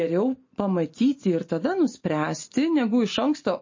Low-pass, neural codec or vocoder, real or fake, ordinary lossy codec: 7.2 kHz; none; real; MP3, 32 kbps